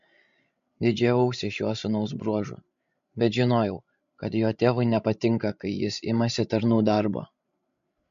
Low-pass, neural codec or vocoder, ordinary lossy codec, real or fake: 7.2 kHz; codec, 16 kHz, 16 kbps, FreqCodec, larger model; MP3, 48 kbps; fake